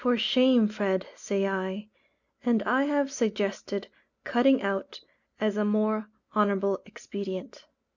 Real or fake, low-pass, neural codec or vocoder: real; 7.2 kHz; none